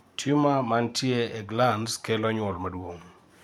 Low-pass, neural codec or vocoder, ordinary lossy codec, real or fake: 19.8 kHz; vocoder, 44.1 kHz, 128 mel bands every 512 samples, BigVGAN v2; none; fake